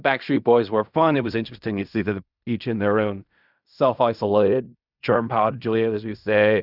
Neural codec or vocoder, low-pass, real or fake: codec, 16 kHz in and 24 kHz out, 0.4 kbps, LongCat-Audio-Codec, fine tuned four codebook decoder; 5.4 kHz; fake